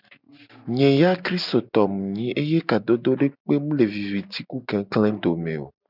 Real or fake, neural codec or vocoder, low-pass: real; none; 5.4 kHz